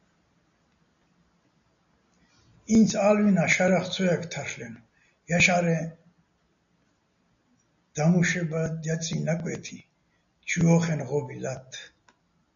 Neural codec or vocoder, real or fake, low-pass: none; real; 7.2 kHz